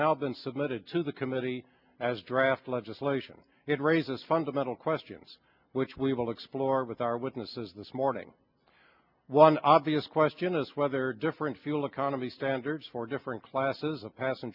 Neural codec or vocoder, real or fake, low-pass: none; real; 5.4 kHz